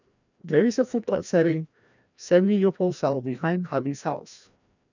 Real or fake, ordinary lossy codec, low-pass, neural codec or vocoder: fake; none; 7.2 kHz; codec, 16 kHz, 1 kbps, FreqCodec, larger model